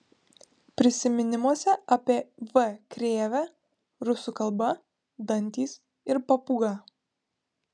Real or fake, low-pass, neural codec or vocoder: real; 9.9 kHz; none